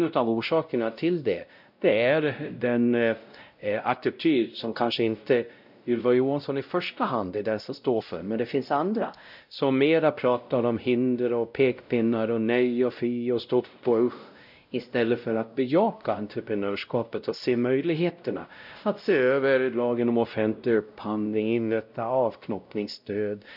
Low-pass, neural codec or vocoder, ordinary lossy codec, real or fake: 5.4 kHz; codec, 16 kHz, 0.5 kbps, X-Codec, WavLM features, trained on Multilingual LibriSpeech; none; fake